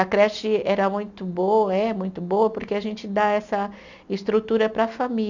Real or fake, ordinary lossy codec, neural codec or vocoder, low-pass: real; none; none; 7.2 kHz